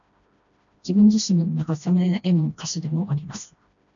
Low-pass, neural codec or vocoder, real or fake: 7.2 kHz; codec, 16 kHz, 1 kbps, FreqCodec, smaller model; fake